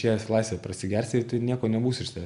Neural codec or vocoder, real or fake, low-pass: none; real; 10.8 kHz